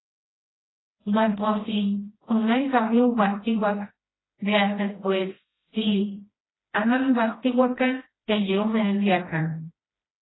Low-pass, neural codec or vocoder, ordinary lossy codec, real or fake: 7.2 kHz; codec, 16 kHz, 1 kbps, FreqCodec, smaller model; AAC, 16 kbps; fake